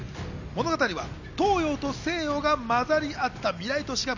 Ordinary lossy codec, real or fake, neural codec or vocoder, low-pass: none; real; none; 7.2 kHz